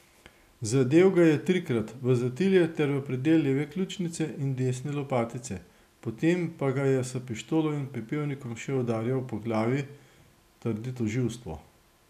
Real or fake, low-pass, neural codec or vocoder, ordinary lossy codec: real; 14.4 kHz; none; none